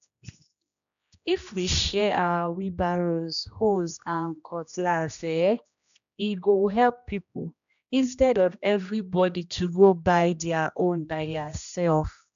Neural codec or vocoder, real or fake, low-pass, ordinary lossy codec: codec, 16 kHz, 1 kbps, X-Codec, HuBERT features, trained on general audio; fake; 7.2 kHz; AAC, 96 kbps